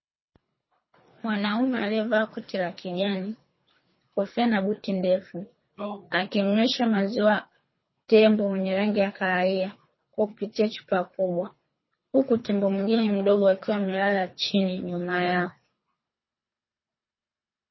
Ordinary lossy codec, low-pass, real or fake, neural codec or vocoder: MP3, 24 kbps; 7.2 kHz; fake; codec, 24 kHz, 3 kbps, HILCodec